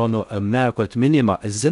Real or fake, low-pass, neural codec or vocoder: fake; 10.8 kHz; codec, 16 kHz in and 24 kHz out, 0.6 kbps, FocalCodec, streaming, 2048 codes